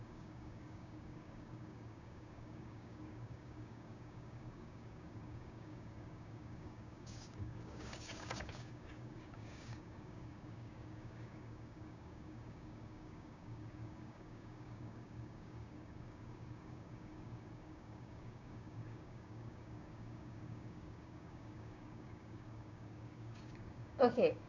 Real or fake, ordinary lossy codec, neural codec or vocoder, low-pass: fake; none; codec, 16 kHz, 6 kbps, DAC; 7.2 kHz